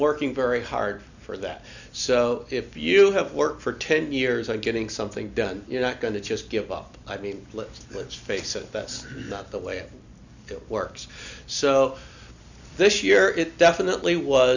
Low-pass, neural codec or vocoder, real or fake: 7.2 kHz; none; real